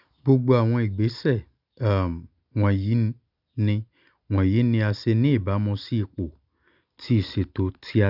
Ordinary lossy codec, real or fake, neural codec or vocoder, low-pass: none; real; none; 5.4 kHz